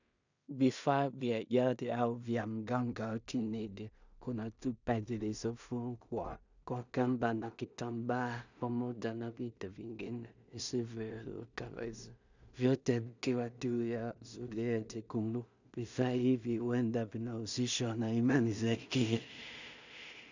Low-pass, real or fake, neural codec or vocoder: 7.2 kHz; fake; codec, 16 kHz in and 24 kHz out, 0.4 kbps, LongCat-Audio-Codec, two codebook decoder